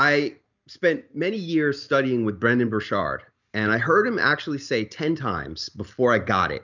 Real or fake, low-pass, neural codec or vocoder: real; 7.2 kHz; none